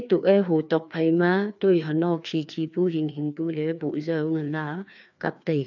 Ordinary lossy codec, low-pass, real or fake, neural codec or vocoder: none; 7.2 kHz; fake; codec, 16 kHz, 2 kbps, FreqCodec, larger model